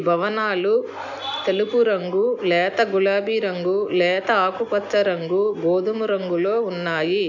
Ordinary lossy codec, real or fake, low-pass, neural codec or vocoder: none; real; 7.2 kHz; none